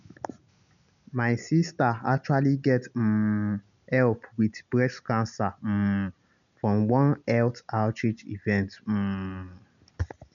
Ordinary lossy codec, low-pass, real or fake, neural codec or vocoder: none; 7.2 kHz; real; none